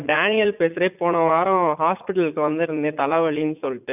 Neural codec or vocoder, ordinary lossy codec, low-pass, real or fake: vocoder, 44.1 kHz, 80 mel bands, Vocos; none; 3.6 kHz; fake